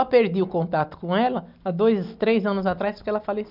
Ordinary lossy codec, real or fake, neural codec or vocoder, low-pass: none; real; none; 5.4 kHz